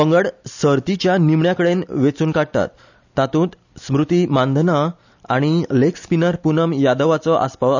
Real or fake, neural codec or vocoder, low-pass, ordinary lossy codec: real; none; 7.2 kHz; none